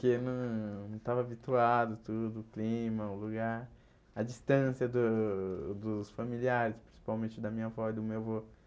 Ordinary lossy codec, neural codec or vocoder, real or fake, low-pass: none; none; real; none